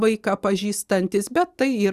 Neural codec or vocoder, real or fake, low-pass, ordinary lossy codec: none; real; 14.4 kHz; Opus, 64 kbps